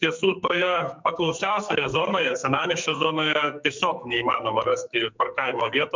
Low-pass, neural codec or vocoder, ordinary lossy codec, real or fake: 7.2 kHz; codec, 44.1 kHz, 2.6 kbps, SNAC; MP3, 64 kbps; fake